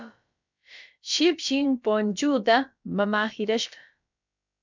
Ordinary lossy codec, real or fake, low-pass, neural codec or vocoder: MP3, 64 kbps; fake; 7.2 kHz; codec, 16 kHz, about 1 kbps, DyCAST, with the encoder's durations